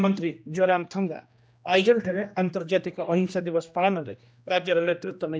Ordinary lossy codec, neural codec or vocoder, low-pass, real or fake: none; codec, 16 kHz, 1 kbps, X-Codec, HuBERT features, trained on general audio; none; fake